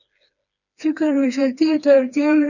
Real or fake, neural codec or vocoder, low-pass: fake; codec, 16 kHz, 2 kbps, FreqCodec, smaller model; 7.2 kHz